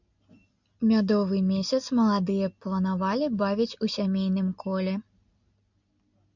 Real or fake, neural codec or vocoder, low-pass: real; none; 7.2 kHz